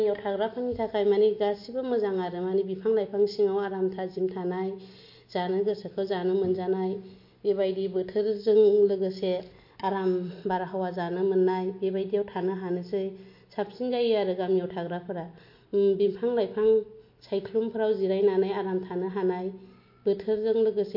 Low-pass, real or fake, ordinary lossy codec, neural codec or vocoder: 5.4 kHz; real; MP3, 32 kbps; none